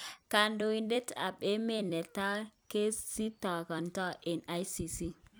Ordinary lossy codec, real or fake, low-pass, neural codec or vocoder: none; fake; none; vocoder, 44.1 kHz, 128 mel bands every 256 samples, BigVGAN v2